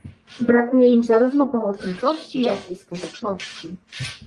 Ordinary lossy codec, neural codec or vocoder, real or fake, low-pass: Opus, 32 kbps; codec, 44.1 kHz, 1.7 kbps, Pupu-Codec; fake; 10.8 kHz